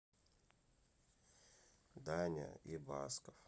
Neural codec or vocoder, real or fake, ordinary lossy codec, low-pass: none; real; none; none